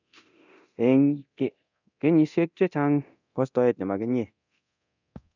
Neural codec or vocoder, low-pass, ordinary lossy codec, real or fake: codec, 24 kHz, 0.9 kbps, DualCodec; 7.2 kHz; none; fake